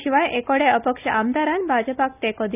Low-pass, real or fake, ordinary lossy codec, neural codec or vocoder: 3.6 kHz; real; none; none